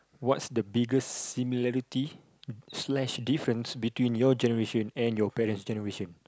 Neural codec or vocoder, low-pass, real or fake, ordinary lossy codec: none; none; real; none